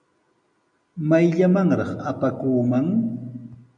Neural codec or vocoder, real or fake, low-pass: none; real; 9.9 kHz